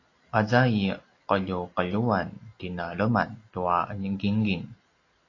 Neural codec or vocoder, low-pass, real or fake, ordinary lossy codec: none; 7.2 kHz; real; AAC, 32 kbps